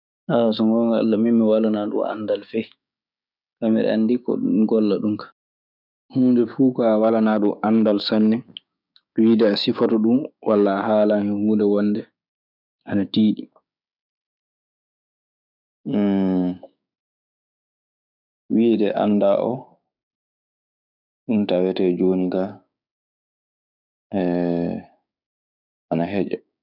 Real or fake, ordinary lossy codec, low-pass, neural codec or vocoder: fake; none; 5.4 kHz; codec, 16 kHz, 6 kbps, DAC